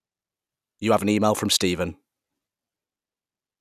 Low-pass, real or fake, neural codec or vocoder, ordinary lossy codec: 14.4 kHz; real; none; none